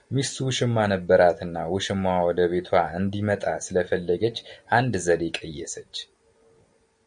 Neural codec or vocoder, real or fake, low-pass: none; real; 9.9 kHz